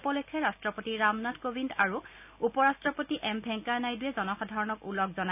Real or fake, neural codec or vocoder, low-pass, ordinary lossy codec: real; none; 3.6 kHz; none